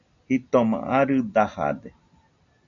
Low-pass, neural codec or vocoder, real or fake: 7.2 kHz; none; real